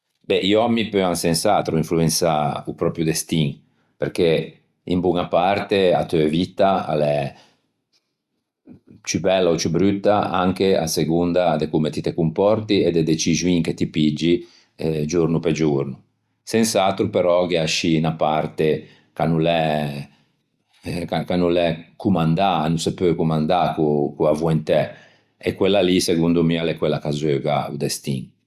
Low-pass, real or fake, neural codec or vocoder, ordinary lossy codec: 14.4 kHz; real; none; Opus, 64 kbps